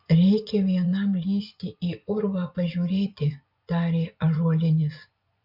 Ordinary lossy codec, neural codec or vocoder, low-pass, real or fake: MP3, 48 kbps; vocoder, 24 kHz, 100 mel bands, Vocos; 5.4 kHz; fake